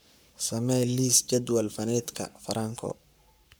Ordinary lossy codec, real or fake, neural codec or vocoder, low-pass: none; fake; codec, 44.1 kHz, 7.8 kbps, Pupu-Codec; none